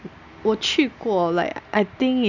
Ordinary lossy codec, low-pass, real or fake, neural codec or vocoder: none; 7.2 kHz; real; none